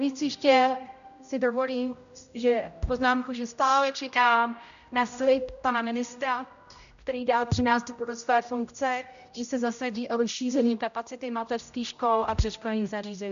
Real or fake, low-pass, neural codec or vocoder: fake; 7.2 kHz; codec, 16 kHz, 0.5 kbps, X-Codec, HuBERT features, trained on general audio